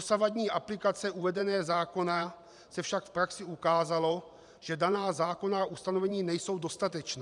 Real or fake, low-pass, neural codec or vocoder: fake; 10.8 kHz; vocoder, 44.1 kHz, 128 mel bands every 512 samples, BigVGAN v2